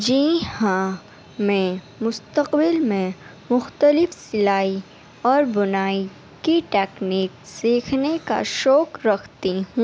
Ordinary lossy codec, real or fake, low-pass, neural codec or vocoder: none; real; none; none